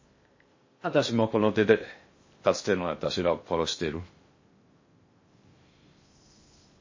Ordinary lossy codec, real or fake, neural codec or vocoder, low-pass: MP3, 32 kbps; fake; codec, 16 kHz in and 24 kHz out, 0.6 kbps, FocalCodec, streaming, 2048 codes; 7.2 kHz